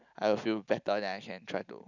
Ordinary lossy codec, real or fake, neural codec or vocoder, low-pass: none; real; none; 7.2 kHz